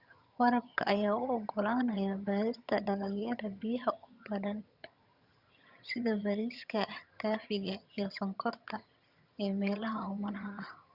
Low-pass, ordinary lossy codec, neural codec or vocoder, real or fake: 5.4 kHz; Opus, 64 kbps; vocoder, 22.05 kHz, 80 mel bands, HiFi-GAN; fake